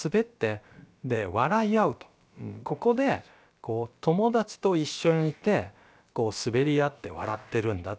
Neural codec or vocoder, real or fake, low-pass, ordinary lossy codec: codec, 16 kHz, about 1 kbps, DyCAST, with the encoder's durations; fake; none; none